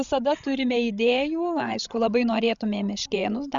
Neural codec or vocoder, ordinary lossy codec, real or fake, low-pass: codec, 16 kHz, 16 kbps, FreqCodec, larger model; Opus, 64 kbps; fake; 7.2 kHz